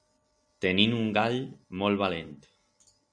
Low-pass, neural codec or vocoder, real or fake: 9.9 kHz; none; real